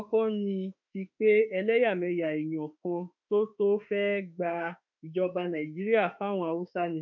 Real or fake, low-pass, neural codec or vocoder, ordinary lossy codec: fake; 7.2 kHz; autoencoder, 48 kHz, 32 numbers a frame, DAC-VAE, trained on Japanese speech; none